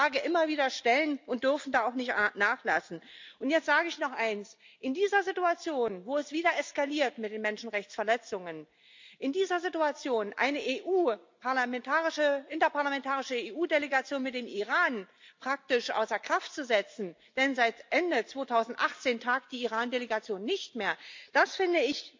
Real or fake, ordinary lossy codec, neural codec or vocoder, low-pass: real; MP3, 64 kbps; none; 7.2 kHz